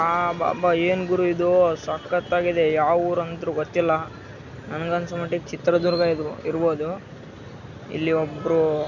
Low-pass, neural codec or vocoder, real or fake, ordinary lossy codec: 7.2 kHz; none; real; none